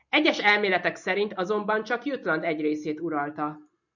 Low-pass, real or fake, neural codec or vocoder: 7.2 kHz; real; none